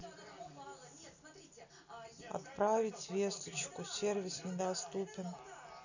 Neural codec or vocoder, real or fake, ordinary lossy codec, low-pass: none; real; none; 7.2 kHz